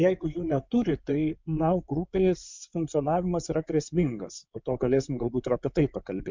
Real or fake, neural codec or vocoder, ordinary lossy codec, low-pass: fake; codec, 16 kHz in and 24 kHz out, 2.2 kbps, FireRedTTS-2 codec; MP3, 64 kbps; 7.2 kHz